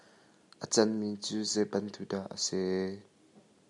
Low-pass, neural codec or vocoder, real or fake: 10.8 kHz; none; real